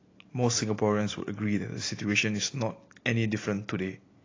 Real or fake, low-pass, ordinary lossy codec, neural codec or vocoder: real; 7.2 kHz; AAC, 32 kbps; none